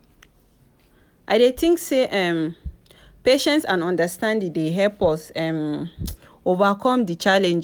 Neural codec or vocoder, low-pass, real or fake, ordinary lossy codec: none; none; real; none